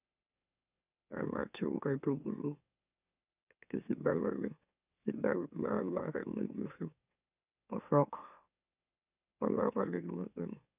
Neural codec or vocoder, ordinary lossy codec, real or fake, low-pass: autoencoder, 44.1 kHz, a latent of 192 numbers a frame, MeloTTS; none; fake; 3.6 kHz